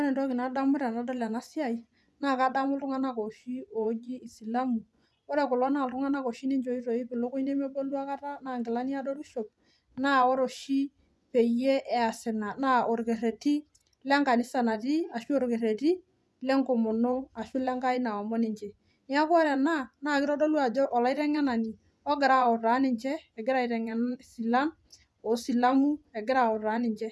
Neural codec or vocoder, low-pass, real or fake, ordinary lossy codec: vocoder, 24 kHz, 100 mel bands, Vocos; none; fake; none